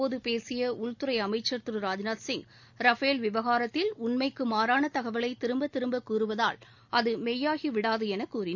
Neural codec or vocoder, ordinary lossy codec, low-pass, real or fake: none; none; 7.2 kHz; real